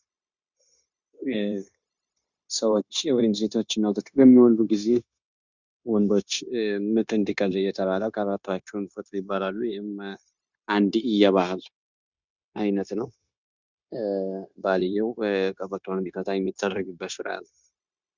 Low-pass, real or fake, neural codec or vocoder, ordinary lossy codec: 7.2 kHz; fake; codec, 16 kHz, 0.9 kbps, LongCat-Audio-Codec; Opus, 64 kbps